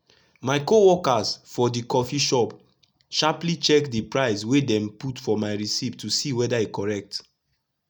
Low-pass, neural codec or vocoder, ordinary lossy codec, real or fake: none; none; none; real